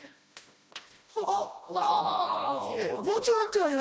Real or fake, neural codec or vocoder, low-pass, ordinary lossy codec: fake; codec, 16 kHz, 1 kbps, FreqCodec, smaller model; none; none